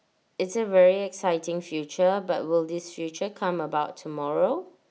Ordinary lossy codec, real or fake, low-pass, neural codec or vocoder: none; real; none; none